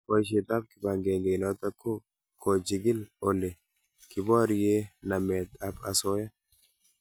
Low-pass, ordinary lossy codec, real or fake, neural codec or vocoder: none; none; real; none